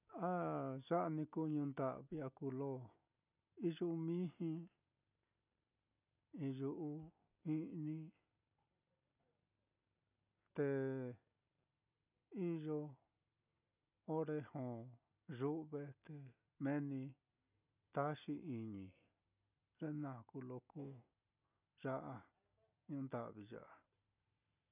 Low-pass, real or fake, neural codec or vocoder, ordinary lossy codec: 3.6 kHz; real; none; none